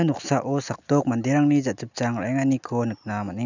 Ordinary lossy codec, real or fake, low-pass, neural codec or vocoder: none; real; 7.2 kHz; none